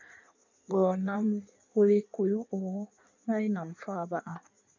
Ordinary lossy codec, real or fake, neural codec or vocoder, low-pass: MP3, 64 kbps; fake; codec, 16 kHz in and 24 kHz out, 1.1 kbps, FireRedTTS-2 codec; 7.2 kHz